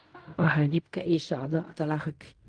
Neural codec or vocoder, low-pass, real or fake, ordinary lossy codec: codec, 16 kHz in and 24 kHz out, 0.4 kbps, LongCat-Audio-Codec, fine tuned four codebook decoder; 9.9 kHz; fake; Opus, 32 kbps